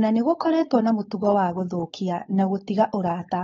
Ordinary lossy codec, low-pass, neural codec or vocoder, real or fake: AAC, 24 kbps; 7.2 kHz; codec, 16 kHz, 4.8 kbps, FACodec; fake